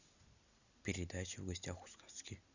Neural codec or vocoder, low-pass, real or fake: none; 7.2 kHz; real